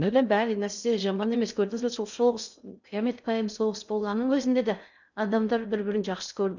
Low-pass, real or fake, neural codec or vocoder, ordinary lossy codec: 7.2 kHz; fake; codec, 16 kHz in and 24 kHz out, 0.6 kbps, FocalCodec, streaming, 2048 codes; none